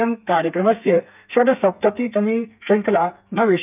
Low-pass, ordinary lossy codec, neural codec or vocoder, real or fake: 3.6 kHz; none; codec, 32 kHz, 1.9 kbps, SNAC; fake